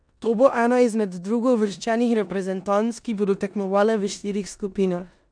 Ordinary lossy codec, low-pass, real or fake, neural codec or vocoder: none; 9.9 kHz; fake; codec, 16 kHz in and 24 kHz out, 0.9 kbps, LongCat-Audio-Codec, four codebook decoder